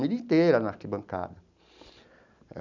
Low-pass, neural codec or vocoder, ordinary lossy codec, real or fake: 7.2 kHz; none; none; real